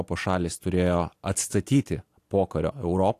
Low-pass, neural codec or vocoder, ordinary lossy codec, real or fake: 14.4 kHz; none; AAC, 64 kbps; real